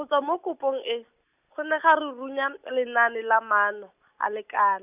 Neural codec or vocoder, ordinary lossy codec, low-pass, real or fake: none; none; 3.6 kHz; real